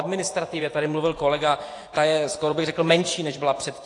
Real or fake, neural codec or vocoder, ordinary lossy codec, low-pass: fake; vocoder, 24 kHz, 100 mel bands, Vocos; AAC, 48 kbps; 10.8 kHz